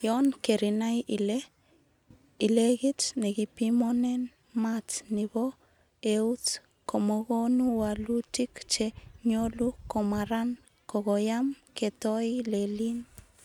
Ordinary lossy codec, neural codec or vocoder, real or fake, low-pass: none; none; real; 19.8 kHz